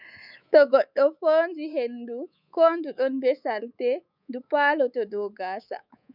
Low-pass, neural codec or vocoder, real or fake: 5.4 kHz; codec, 24 kHz, 3.1 kbps, DualCodec; fake